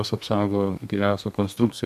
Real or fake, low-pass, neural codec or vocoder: fake; 14.4 kHz; codec, 32 kHz, 1.9 kbps, SNAC